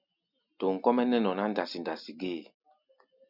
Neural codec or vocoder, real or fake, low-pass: none; real; 5.4 kHz